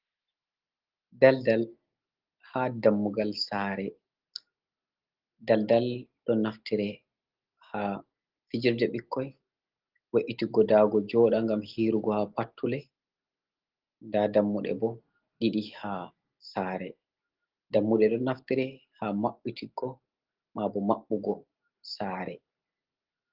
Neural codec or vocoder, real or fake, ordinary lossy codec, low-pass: none; real; Opus, 16 kbps; 5.4 kHz